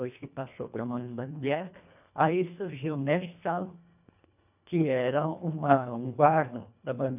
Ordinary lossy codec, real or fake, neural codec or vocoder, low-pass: none; fake; codec, 24 kHz, 1.5 kbps, HILCodec; 3.6 kHz